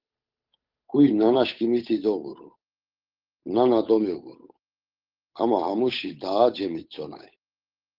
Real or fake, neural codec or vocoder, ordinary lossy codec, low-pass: fake; codec, 16 kHz, 8 kbps, FunCodec, trained on Chinese and English, 25 frames a second; Opus, 32 kbps; 5.4 kHz